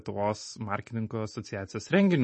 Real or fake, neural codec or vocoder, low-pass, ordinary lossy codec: real; none; 9.9 kHz; MP3, 32 kbps